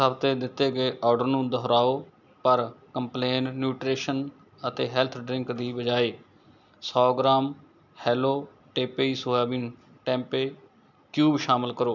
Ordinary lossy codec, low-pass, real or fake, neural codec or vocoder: none; 7.2 kHz; real; none